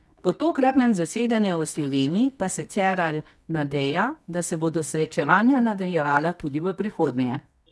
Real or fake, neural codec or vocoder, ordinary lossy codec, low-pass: fake; codec, 24 kHz, 0.9 kbps, WavTokenizer, medium music audio release; none; none